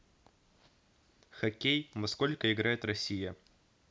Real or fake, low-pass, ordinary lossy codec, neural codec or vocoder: real; none; none; none